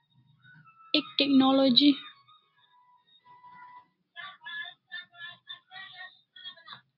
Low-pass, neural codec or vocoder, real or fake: 5.4 kHz; none; real